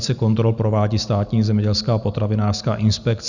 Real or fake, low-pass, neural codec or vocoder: real; 7.2 kHz; none